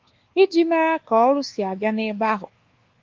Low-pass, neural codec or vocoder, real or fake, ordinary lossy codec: 7.2 kHz; codec, 24 kHz, 1.2 kbps, DualCodec; fake; Opus, 16 kbps